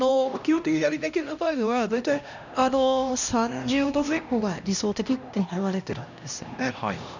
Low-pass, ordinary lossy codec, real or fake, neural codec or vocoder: 7.2 kHz; none; fake; codec, 16 kHz, 1 kbps, X-Codec, HuBERT features, trained on LibriSpeech